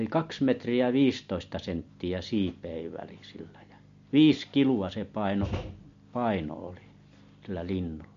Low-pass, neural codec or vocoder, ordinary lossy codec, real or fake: 7.2 kHz; none; MP3, 48 kbps; real